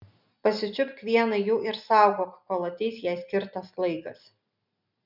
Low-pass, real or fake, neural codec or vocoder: 5.4 kHz; real; none